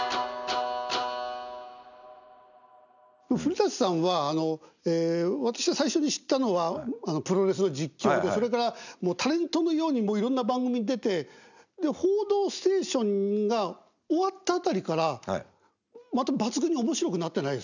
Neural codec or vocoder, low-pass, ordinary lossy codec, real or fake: none; 7.2 kHz; none; real